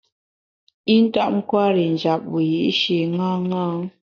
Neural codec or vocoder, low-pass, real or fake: none; 7.2 kHz; real